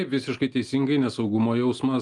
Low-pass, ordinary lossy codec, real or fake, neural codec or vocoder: 10.8 kHz; Opus, 32 kbps; real; none